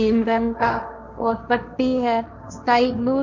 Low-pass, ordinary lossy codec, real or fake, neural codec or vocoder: 7.2 kHz; none; fake; codec, 16 kHz, 1.1 kbps, Voila-Tokenizer